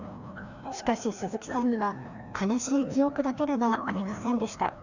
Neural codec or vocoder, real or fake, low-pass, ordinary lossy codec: codec, 16 kHz, 1 kbps, FreqCodec, larger model; fake; 7.2 kHz; none